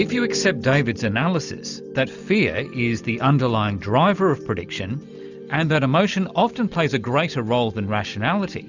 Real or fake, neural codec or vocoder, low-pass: real; none; 7.2 kHz